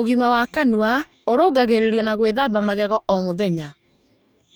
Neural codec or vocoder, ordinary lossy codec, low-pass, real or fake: codec, 44.1 kHz, 2.6 kbps, DAC; none; none; fake